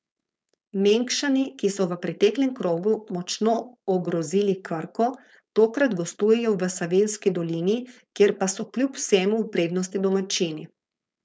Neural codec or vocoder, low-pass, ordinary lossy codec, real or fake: codec, 16 kHz, 4.8 kbps, FACodec; none; none; fake